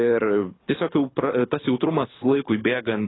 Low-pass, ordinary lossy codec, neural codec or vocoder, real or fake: 7.2 kHz; AAC, 16 kbps; codec, 24 kHz, 6 kbps, HILCodec; fake